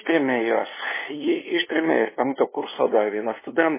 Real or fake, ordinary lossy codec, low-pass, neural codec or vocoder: fake; MP3, 16 kbps; 3.6 kHz; codec, 16 kHz, 2 kbps, FunCodec, trained on LibriTTS, 25 frames a second